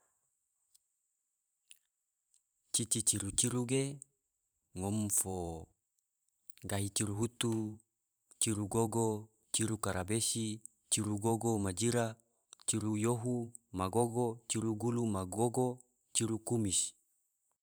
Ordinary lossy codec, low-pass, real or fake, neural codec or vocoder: none; none; fake; vocoder, 44.1 kHz, 128 mel bands every 512 samples, BigVGAN v2